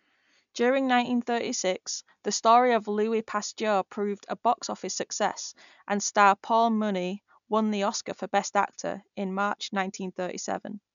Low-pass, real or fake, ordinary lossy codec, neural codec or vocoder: 7.2 kHz; real; none; none